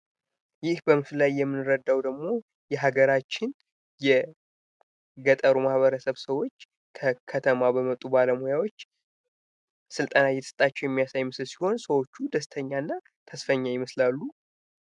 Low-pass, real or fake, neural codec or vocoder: 10.8 kHz; real; none